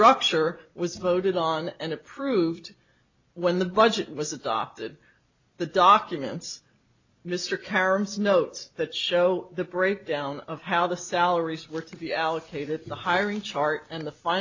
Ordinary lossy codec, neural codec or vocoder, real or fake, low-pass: MP3, 64 kbps; none; real; 7.2 kHz